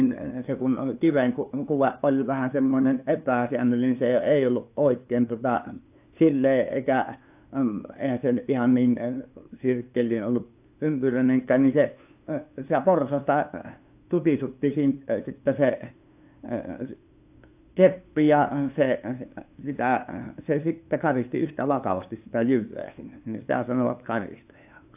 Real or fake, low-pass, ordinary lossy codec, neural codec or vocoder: fake; 3.6 kHz; AAC, 32 kbps; codec, 16 kHz, 2 kbps, FunCodec, trained on LibriTTS, 25 frames a second